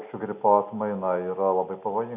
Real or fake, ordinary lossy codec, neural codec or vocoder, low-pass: real; AAC, 32 kbps; none; 3.6 kHz